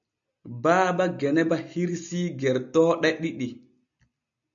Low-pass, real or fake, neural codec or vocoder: 7.2 kHz; real; none